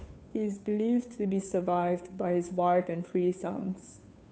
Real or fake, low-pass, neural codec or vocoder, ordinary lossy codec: fake; none; codec, 16 kHz, 2 kbps, FunCodec, trained on Chinese and English, 25 frames a second; none